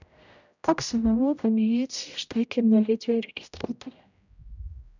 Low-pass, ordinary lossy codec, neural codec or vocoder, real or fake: 7.2 kHz; none; codec, 16 kHz, 0.5 kbps, X-Codec, HuBERT features, trained on general audio; fake